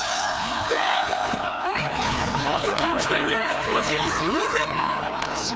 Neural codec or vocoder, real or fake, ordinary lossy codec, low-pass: codec, 16 kHz, 2 kbps, FreqCodec, larger model; fake; none; none